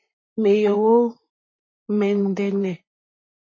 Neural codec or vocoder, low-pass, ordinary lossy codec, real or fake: vocoder, 44.1 kHz, 128 mel bands, Pupu-Vocoder; 7.2 kHz; MP3, 32 kbps; fake